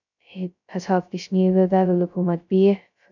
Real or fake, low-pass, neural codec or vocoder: fake; 7.2 kHz; codec, 16 kHz, 0.2 kbps, FocalCodec